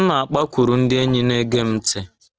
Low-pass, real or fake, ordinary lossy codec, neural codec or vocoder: 7.2 kHz; real; Opus, 16 kbps; none